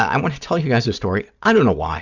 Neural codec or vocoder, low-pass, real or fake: none; 7.2 kHz; real